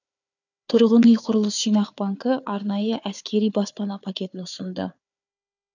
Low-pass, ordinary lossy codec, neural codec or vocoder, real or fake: 7.2 kHz; none; codec, 16 kHz, 4 kbps, FunCodec, trained on Chinese and English, 50 frames a second; fake